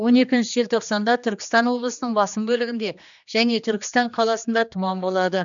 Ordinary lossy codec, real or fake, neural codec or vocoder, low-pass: none; fake; codec, 16 kHz, 2 kbps, X-Codec, HuBERT features, trained on general audio; 7.2 kHz